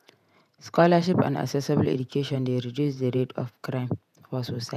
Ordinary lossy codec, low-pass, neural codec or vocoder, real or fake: none; 14.4 kHz; vocoder, 48 kHz, 128 mel bands, Vocos; fake